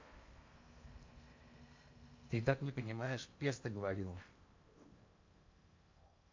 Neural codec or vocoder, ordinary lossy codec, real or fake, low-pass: codec, 16 kHz in and 24 kHz out, 0.8 kbps, FocalCodec, streaming, 65536 codes; MP3, 48 kbps; fake; 7.2 kHz